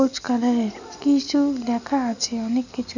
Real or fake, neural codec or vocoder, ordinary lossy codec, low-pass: real; none; none; 7.2 kHz